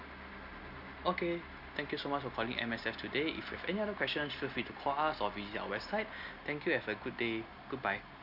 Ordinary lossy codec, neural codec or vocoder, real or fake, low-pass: none; none; real; 5.4 kHz